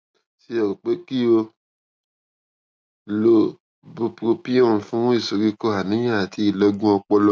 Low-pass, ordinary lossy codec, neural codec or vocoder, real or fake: none; none; none; real